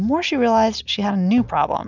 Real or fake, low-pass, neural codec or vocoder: real; 7.2 kHz; none